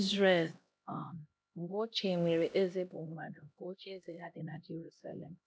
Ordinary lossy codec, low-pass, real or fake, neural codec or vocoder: none; none; fake; codec, 16 kHz, 1 kbps, X-Codec, HuBERT features, trained on LibriSpeech